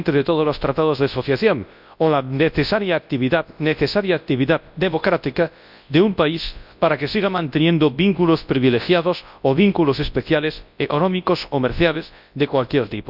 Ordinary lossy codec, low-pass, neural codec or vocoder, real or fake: none; 5.4 kHz; codec, 24 kHz, 0.9 kbps, WavTokenizer, large speech release; fake